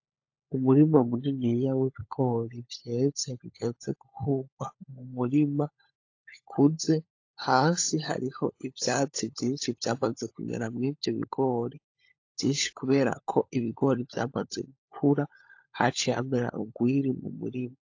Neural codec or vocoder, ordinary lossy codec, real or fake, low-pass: codec, 16 kHz, 16 kbps, FunCodec, trained on LibriTTS, 50 frames a second; AAC, 48 kbps; fake; 7.2 kHz